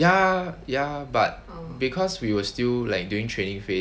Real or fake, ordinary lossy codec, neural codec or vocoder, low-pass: real; none; none; none